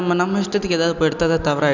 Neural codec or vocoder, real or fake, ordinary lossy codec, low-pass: none; real; none; 7.2 kHz